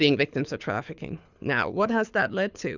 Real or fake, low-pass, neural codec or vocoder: fake; 7.2 kHz; codec, 24 kHz, 6 kbps, HILCodec